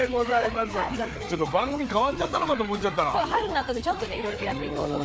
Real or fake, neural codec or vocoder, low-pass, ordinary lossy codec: fake; codec, 16 kHz, 4 kbps, FreqCodec, larger model; none; none